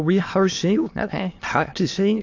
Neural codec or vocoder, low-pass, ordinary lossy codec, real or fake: autoencoder, 22.05 kHz, a latent of 192 numbers a frame, VITS, trained on many speakers; 7.2 kHz; AAC, 48 kbps; fake